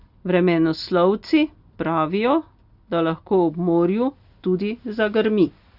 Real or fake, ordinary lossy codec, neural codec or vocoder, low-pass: real; Opus, 64 kbps; none; 5.4 kHz